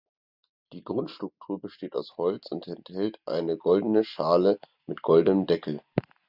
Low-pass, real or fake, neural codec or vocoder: 5.4 kHz; real; none